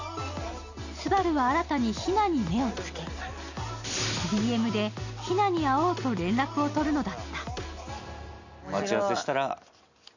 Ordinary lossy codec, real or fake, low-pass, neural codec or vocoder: none; real; 7.2 kHz; none